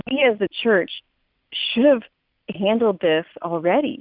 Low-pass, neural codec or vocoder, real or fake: 5.4 kHz; none; real